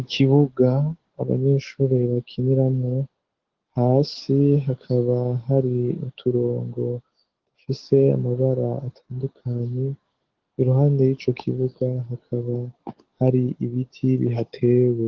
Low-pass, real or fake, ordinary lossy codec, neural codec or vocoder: 7.2 kHz; fake; Opus, 16 kbps; codec, 44.1 kHz, 7.8 kbps, DAC